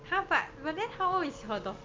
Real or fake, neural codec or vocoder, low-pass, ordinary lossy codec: real; none; 7.2 kHz; Opus, 32 kbps